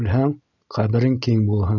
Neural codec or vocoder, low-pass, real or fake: none; 7.2 kHz; real